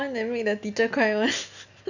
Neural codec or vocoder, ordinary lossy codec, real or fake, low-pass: none; none; real; 7.2 kHz